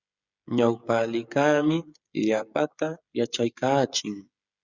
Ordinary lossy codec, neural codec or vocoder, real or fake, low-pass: Opus, 64 kbps; codec, 16 kHz, 16 kbps, FreqCodec, smaller model; fake; 7.2 kHz